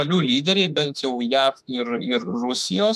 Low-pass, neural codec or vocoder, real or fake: 14.4 kHz; autoencoder, 48 kHz, 32 numbers a frame, DAC-VAE, trained on Japanese speech; fake